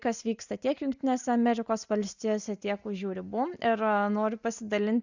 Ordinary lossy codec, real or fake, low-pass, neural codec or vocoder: Opus, 64 kbps; real; 7.2 kHz; none